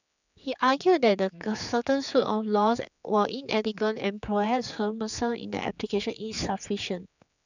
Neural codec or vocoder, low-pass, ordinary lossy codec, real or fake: codec, 16 kHz, 4 kbps, X-Codec, HuBERT features, trained on general audio; 7.2 kHz; none; fake